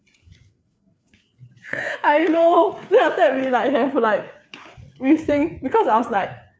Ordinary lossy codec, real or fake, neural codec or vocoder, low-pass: none; fake; codec, 16 kHz, 4 kbps, FreqCodec, larger model; none